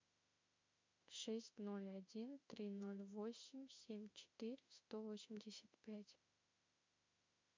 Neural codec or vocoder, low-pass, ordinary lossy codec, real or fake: autoencoder, 48 kHz, 32 numbers a frame, DAC-VAE, trained on Japanese speech; 7.2 kHz; MP3, 64 kbps; fake